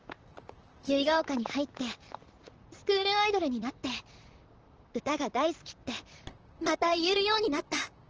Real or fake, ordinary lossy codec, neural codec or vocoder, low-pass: fake; Opus, 16 kbps; vocoder, 44.1 kHz, 128 mel bands, Pupu-Vocoder; 7.2 kHz